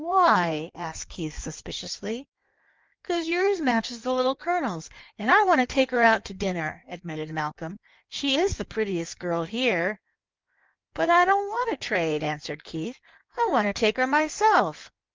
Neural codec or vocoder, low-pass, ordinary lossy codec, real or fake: codec, 16 kHz in and 24 kHz out, 1.1 kbps, FireRedTTS-2 codec; 7.2 kHz; Opus, 24 kbps; fake